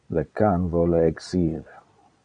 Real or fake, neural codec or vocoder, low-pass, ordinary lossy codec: fake; vocoder, 22.05 kHz, 80 mel bands, Vocos; 9.9 kHz; MP3, 96 kbps